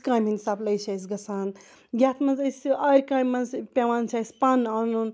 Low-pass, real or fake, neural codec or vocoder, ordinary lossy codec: none; real; none; none